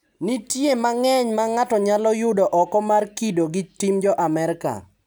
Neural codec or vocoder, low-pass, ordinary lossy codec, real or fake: none; none; none; real